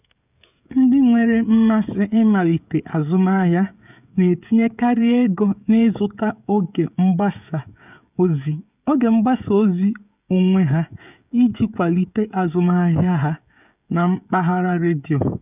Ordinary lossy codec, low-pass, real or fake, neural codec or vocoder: none; 3.6 kHz; fake; codec, 16 kHz, 16 kbps, FreqCodec, smaller model